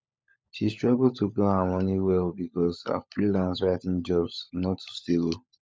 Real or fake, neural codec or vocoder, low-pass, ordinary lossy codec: fake; codec, 16 kHz, 16 kbps, FunCodec, trained on LibriTTS, 50 frames a second; none; none